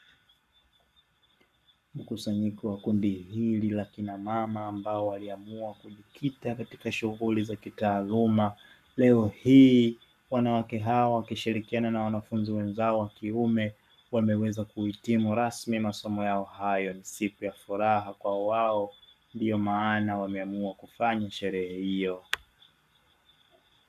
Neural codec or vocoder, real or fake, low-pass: codec, 44.1 kHz, 7.8 kbps, Pupu-Codec; fake; 14.4 kHz